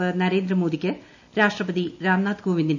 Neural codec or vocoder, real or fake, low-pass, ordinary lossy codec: none; real; 7.2 kHz; none